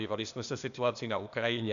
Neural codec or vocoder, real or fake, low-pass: codec, 16 kHz, 0.8 kbps, ZipCodec; fake; 7.2 kHz